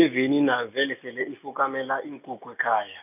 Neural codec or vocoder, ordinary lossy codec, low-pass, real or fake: none; none; 3.6 kHz; real